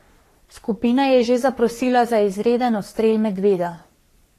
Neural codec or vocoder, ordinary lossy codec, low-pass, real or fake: codec, 44.1 kHz, 3.4 kbps, Pupu-Codec; AAC, 48 kbps; 14.4 kHz; fake